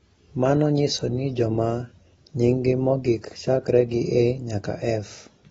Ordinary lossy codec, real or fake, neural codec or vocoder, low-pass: AAC, 24 kbps; real; none; 19.8 kHz